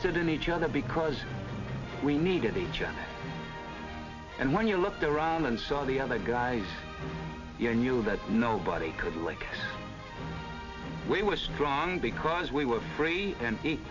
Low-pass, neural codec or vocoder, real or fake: 7.2 kHz; none; real